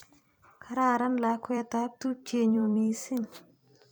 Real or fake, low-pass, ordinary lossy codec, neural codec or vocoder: fake; none; none; vocoder, 44.1 kHz, 128 mel bands every 256 samples, BigVGAN v2